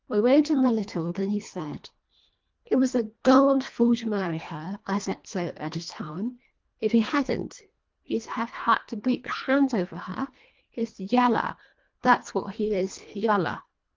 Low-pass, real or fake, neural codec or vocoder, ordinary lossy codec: 7.2 kHz; fake; codec, 24 kHz, 1.5 kbps, HILCodec; Opus, 32 kbps